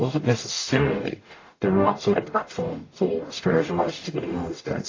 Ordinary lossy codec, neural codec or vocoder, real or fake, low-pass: AAC, 32 kbps; codec, 44.1 kHz, 0.9 kbps, DAC; fake; 7.2 kHz